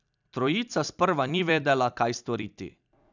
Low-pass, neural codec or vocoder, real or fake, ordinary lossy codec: 7.2 kHz; vocoder, 44.1 kHz, 128 mel bands every 256 samples, BigVGAN v2; fake; none